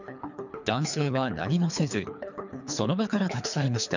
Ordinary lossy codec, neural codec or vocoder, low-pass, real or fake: none; codec, 24 kHz, 3 kbps, HILCodec; 7.2 kHz; fake